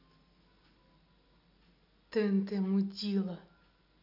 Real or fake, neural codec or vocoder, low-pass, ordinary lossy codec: real; none; 5.4 kHz; none